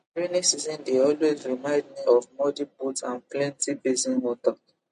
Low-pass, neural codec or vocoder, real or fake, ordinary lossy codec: 10.8 kHz; none; real; MP3, 48 kbps